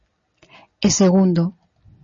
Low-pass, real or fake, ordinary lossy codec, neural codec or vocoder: 7.2 kHz; real; MP3, 32 kbps; none